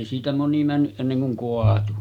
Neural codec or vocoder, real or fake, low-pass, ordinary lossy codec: none; real; 19.8 kHz; none